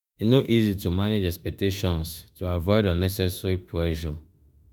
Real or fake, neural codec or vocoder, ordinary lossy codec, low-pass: fake; autoencoder, 48 kHz, 32 numbers a frame, DAC-VAE, trained on Japanese speech; none; none